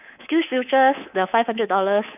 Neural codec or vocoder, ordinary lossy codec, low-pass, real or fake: codec, 24 kHz, 3.1 kbps, DualCodec; none; 3.6 kHz; fake